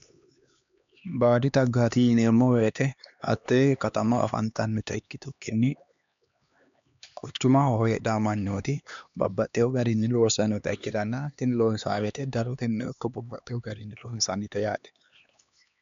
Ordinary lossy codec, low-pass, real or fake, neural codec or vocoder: MP3, 64 kbps; 7.2 kHz; fake; codec, 16 kHz, 2 kbps, X-Codec, HuBERT features, trained on LibriSpeech